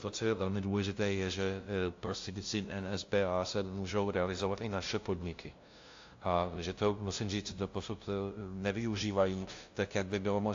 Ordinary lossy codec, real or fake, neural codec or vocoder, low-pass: AAC, 48 kbps; fake; codec, 16 kHz, 0.5 kbps, FunCodec, trained on LibriTTS, 25 frames a second; 7.2 kHz